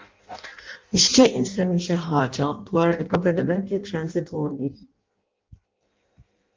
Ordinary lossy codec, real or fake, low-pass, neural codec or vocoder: Opus, 32 kbps; fake; 7.2 kHz; codec, 16 kHz in and 24 kHz out, 0.6 kbps, FireRedTTS-2 codec